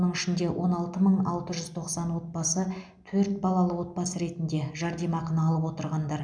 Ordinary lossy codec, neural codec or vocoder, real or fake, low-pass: none; none; real; 9.9 kHz